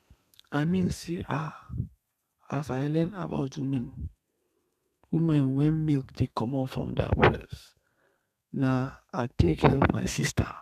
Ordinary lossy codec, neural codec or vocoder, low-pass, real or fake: none; codec, 32 kHz, 1.9 kbps, SNAC; 14.4 kHz; fake